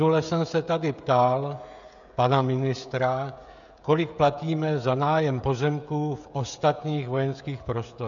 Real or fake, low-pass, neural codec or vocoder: fake; 7.2 kHz; codec, 16 kHz, 16 kbps, FreqCodec, smaller model